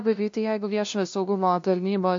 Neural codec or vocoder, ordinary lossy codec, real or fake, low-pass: codec, 16 kHz, 0.5 kbps, FunCodec, trained on LibriTTS, 25 frames a second; MP3, 48 kbps; fake; 7.2 kHz